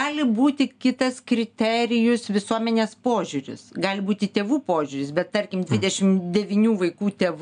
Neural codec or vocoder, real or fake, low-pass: none; real; 9.9 kHz